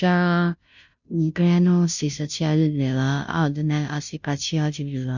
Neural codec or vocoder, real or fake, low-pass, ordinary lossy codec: codec, 16 kHz, 0.5 kbps, FunCodec, trained on Chinese and English, 25 frames a second; fake; 7.2 kHz; none